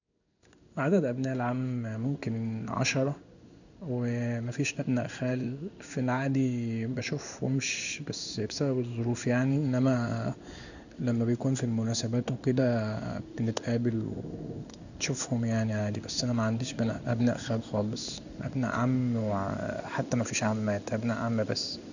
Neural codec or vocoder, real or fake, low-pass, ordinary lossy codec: codec, 16 kHz in and 24 kHz out, 1 kbps, XY-Tokenizer; fake; 7.2 kHz; none